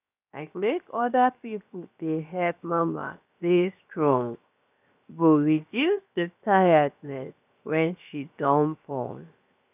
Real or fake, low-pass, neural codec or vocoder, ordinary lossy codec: fake; 3.6 kHz; codec, 16 kHz, 0.7 kbps, FocalCodec; none